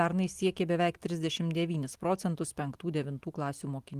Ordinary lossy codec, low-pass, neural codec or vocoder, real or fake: Opus, 24 kbps; 14.4 kHz; none; real